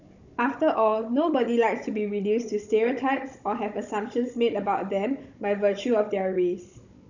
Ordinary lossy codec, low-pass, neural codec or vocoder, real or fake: none; 7.2 kHz; codec, 16 kHz, 16 kbps, FunCodec, trained on Chinese and English, 50 frames a second; fake